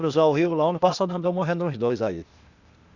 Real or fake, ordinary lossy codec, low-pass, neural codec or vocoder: fake; Opus, 64 kbps; 7.2 kHz; codec, 16 kHz, 0.8 kbps, ZipCodec